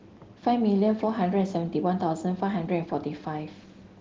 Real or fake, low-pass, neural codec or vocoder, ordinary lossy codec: real; 7.2 kHz; none; Opus, 16 kbps